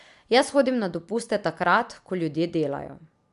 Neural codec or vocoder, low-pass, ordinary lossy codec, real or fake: none; 10.8 kHz; none; real